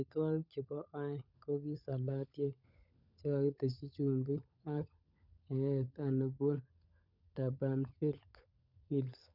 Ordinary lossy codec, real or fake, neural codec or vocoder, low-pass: none; fake; codec, 16 kHz, 16 kbps, FunCodec, trained on LibriTTS, 50 frames a second; 5.4 kHz